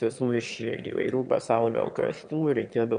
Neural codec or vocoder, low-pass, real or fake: autoencoder, 22.05 kHz, a latent of 192 numbers a frame, VITS, trained on one speaker; 9.9 kHz; fake